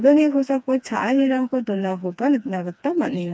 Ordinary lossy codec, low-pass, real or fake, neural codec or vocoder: none; none; fake; codec, 16 kHz, 2 kbps, FreqCodec, smaller model